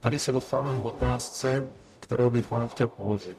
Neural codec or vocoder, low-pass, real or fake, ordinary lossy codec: codec, 44.1 kHz, 0.9 kbps, DAC; 14.4 kHz; fake; AAC, 96 kbps